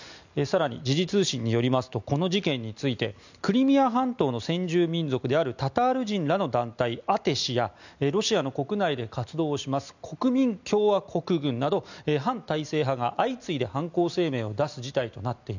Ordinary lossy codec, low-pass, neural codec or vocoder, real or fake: none; 7.2 kHz; none; real